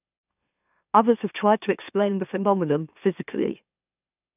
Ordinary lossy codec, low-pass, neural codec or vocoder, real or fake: none; 3.6 kHz; autoencoder, 44.1 kHz, a latent of 192 numbers a frame, MeloTTS; fake